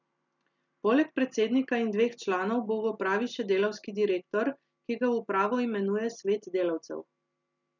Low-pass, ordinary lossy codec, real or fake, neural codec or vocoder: 7.2 kHz; none; real; none